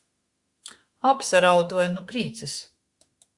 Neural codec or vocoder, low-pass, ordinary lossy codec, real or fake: autoencoder, 48 kHz, 32 numbers a frame, DAC-VAE, trained on Japanese speech; 10.8 kHz; Opus, 64 kbps; fake